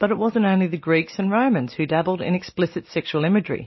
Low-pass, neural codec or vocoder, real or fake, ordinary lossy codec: 7.2 kHz; none; real; MP3, 24 kbps